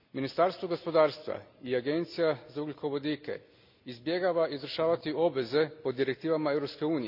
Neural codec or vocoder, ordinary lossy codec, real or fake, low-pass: none; none; real; 5.4 kHz